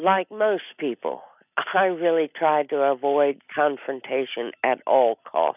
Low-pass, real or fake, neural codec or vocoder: 3.6 kHz; real; none